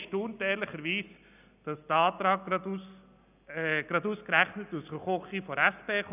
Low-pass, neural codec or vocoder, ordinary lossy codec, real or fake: 3.6 kHz; none; none; real